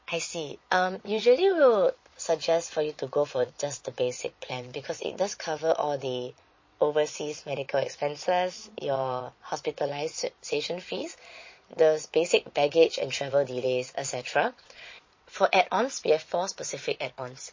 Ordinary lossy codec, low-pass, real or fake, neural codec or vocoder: MP3, 32 kbps; 7.2 kHz; fake; vocoder, 22.05 kHz, 80 mel bands, Vocos